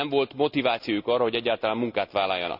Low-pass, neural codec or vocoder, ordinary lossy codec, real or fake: 5.4 kHz; none; none; real